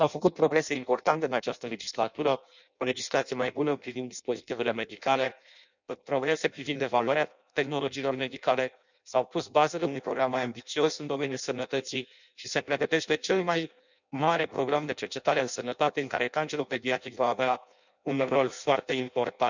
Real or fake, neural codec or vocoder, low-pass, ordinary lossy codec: fake; codec, 16 kHz in and 24 kHz out, 0.6 kbps, FireRedTTS-2 codec; 7.2 kHz; none